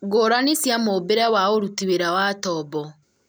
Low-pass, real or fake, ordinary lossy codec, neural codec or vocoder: none; real; none; none